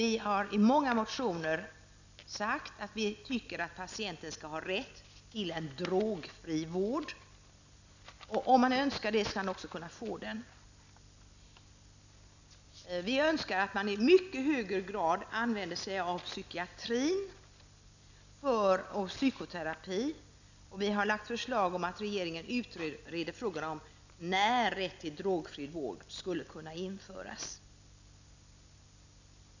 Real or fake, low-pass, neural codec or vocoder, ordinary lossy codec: real; 7.2 kHz; none; none